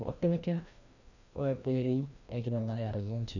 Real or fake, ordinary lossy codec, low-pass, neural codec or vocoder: fake; none; 7.2 kHz; codec, 16 kHz, 1 kbps, FreqCodec, larger model